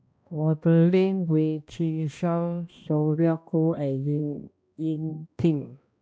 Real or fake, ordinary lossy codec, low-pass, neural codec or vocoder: fake; none; none; codec, 16 kHz, 1 kbps, X-Codec, HuBERT features, trained on balanced general audio